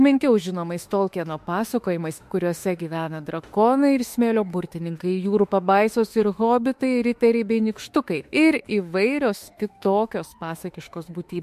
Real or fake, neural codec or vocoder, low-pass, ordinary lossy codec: fake; autoencoder, 48 kHz, 32 numbers a frame, DAC-VAE, trained on Japanese speech; 14.4 kHz; MP3, 64 kbps